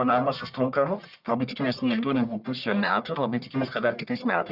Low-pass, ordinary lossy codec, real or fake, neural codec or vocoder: 5.4 kHz; Opus, 64 kbps; fake; codec, 44.1 kHz, 1.7 kbps, Pupu-Codec